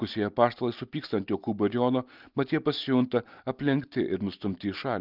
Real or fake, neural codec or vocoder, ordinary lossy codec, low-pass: real; none; Opus, 24 kbps; 5.4 kHz